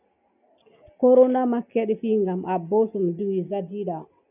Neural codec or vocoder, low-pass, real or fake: none; 3.6 kHz; real